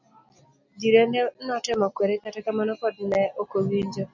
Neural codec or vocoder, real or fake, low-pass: none; real; 7.2 kHz